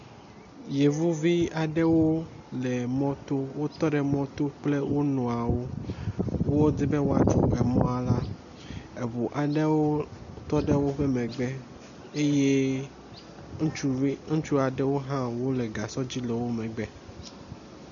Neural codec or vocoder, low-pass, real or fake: none; 7.2 kHz; real